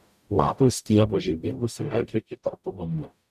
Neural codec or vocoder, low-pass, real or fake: codec, 44.1 kHz, 0.9 kbps, DAC; 14.4 kHz; fake